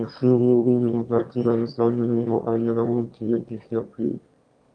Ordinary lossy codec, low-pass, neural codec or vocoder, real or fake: Opus, 32 kbps; 9.9 kHz; autoencoder, 22.05 kHz, a latent of 192 numbers a frame, VITS, trained on one speaker; fake